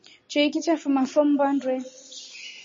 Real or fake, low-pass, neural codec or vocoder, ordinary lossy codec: real; 7.2 kHz; none; MP3, 32 kbps